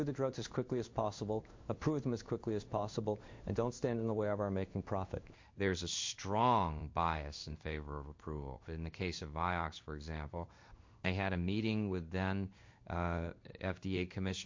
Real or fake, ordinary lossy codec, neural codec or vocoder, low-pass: fake; MP3, 48 kbps; codec, 16 kHz in and 24 kHz out, 1 kbps, XY-Tokenizer; 7.2 kHz